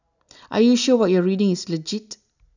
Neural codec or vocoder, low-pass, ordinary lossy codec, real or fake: none; 7.2 kHz; none; real